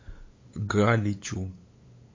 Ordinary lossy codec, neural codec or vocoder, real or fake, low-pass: MP3, 32 kbps; codec, 16 kHz, 8 kbps, FunCodec, trained on LibriTTS, 25 frames a second; fake; 7.2 kHz